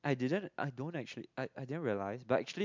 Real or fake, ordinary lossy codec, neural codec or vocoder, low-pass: real; MP3, 64 kbps; none; 7.2 kHz